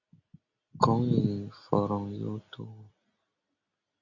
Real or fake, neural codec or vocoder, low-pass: real; none; 7.2 kHz